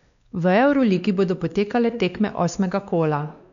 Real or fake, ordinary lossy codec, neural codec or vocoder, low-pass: fake; none; codec, 16 kHz, 2 kbps, X-Codec, WavLM features, trained on Multilingual LibriSpeech; 7.2 kHz